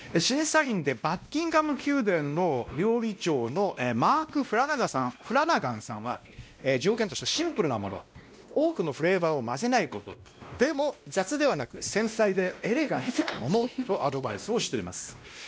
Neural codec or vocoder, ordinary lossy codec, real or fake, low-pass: codec, 16 kHz, 1 kbps, X-Codec, WavLM features, trained on Multilingual LibriSpeech; none; fake; none